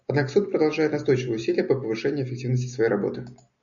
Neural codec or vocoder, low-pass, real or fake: none; 7.2 kHz; real